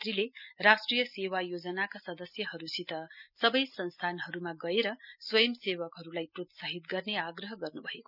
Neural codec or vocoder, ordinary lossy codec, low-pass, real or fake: none; none; 5.4 kHz; real